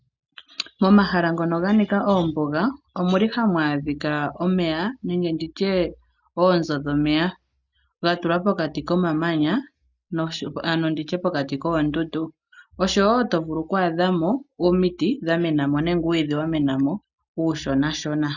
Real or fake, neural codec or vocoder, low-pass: real; none; 7.2 kHz